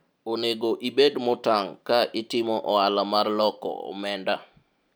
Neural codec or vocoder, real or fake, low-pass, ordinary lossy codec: none; real; none; none